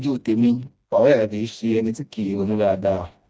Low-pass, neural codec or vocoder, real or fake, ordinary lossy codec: none; codec, 16 kHz, 1 kbps, FreqCodec, smaller model; fake; none